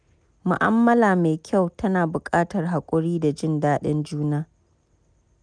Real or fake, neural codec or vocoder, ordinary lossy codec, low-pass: real; none; none; 9.9 kHz